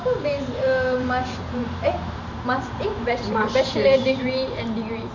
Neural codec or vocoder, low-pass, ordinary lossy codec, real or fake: vocoder, 44.1 kHz, 128 mel bands every 512 samples, BigVGAN v2; 7.2 kHz; none; fake